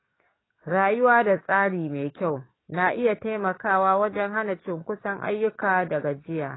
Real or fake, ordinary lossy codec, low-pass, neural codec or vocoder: fake; AAC, 16 kbps; 7.2 kHz; codec, 44.1 kHz, 7.8 kbps, DAC